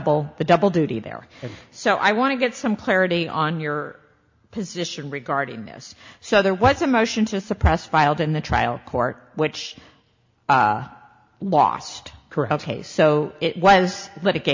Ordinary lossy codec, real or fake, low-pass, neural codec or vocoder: MP3, 64 kbps; real; 7.2 kHz; none